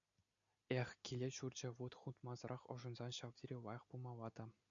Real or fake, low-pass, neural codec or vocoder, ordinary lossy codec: real; 7.2 kHz; none; MP3, 96 kbps